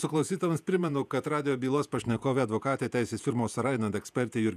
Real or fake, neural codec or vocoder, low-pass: fake; vocoder, 48 kHz, 128 mel bands, Vocos; 14.4 kHz